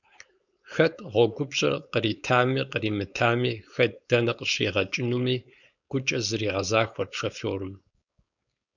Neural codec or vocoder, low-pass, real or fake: codec, 16 kHz, 4.8 kbps, FACodec; 7.2 kHz; fake